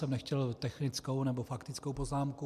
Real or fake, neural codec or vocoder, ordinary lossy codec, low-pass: real; none; MP3, 96 kbps; 14.4 kHz